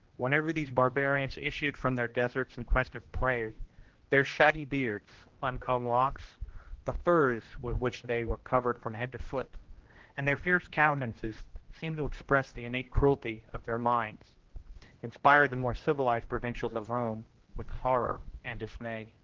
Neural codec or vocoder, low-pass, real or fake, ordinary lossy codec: codec, 16 kHz, 1 kbps, X-Codec, HuBERT features, trained on general audio; 7.2 kHz; fake; Opus, 16 kbps